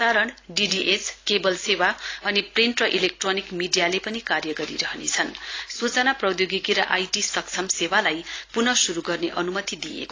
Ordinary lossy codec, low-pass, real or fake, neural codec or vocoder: AAC, 32 kbps; 7.2 kHz; real; none